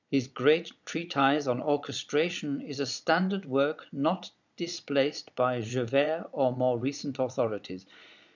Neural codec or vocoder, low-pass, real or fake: vocoder, 22.05 kHz, 80 mel bands, Vocos; 7.2 kHz; fake